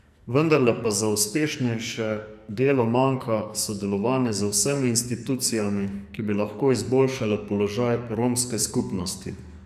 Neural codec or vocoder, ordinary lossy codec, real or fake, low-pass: codec, 44.1 kHz, 2.6 kbps, SNAC; none; fake; 14.4 kHz